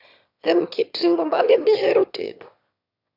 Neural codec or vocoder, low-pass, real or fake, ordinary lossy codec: autoencoder, 22.05 kHz, a latent of 192 numbers a frame, VITS, trained on one speaker; 5.4 kHz; fake; none